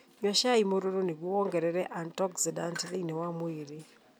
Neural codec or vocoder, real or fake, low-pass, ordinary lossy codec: none; real; none; none